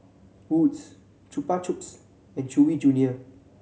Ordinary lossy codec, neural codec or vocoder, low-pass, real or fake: none; none; none; real